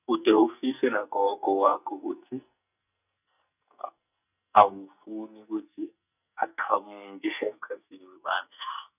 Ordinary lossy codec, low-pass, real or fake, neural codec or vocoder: none; 3.6 kHz; fake; codec, 44.1 kHz, 2.6 kbps, SNAC